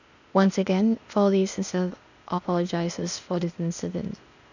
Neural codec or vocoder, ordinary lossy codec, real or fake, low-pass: codec, 16 kHz, 0.8 kbps, ZipCodec; none; fake; 7.2 kHz